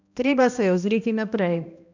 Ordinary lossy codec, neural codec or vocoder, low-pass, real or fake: none; codec, 16 kHz, 1 kbps, X-Codec, HuBERT features, trained on balanced general audio; 7.2 kHz; fake